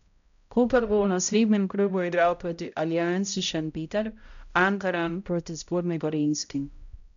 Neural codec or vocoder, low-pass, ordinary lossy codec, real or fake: codec, 16 kHz, 0.5 kbps, X-Codec, HuBERT features, trained on balanced general audio; 7.2 kHz; none; fake